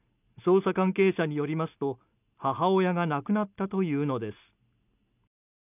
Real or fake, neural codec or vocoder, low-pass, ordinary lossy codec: real; none; 3.6 kHz; none